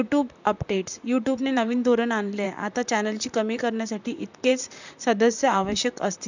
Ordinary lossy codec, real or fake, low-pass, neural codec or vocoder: none; fake; 7.2 kHz; vocoder, 44.1 kHz, 128 mel bands, Pupu-Vocoder